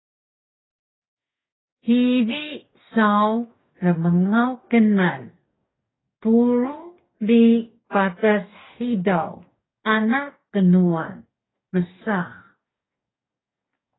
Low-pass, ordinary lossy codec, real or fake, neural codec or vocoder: 7.2 kHz; AAC, 16 kbps; fake; codec, 44.1 kHz, 2.6 kbps, DAC